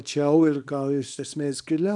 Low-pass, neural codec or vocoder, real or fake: 10.8 kHz; codec, 24 kHz, 0.9 kbps, WavTokenizer, small release; fake